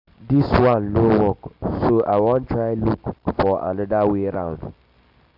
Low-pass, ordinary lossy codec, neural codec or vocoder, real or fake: 5.4 kHz; none; none; real